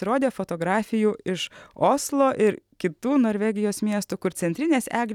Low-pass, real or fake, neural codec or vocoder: 19.8 kHz; real; none